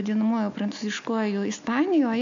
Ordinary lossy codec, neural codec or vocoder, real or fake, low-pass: AAC, 64 kbps; none; real; 7.2 kHz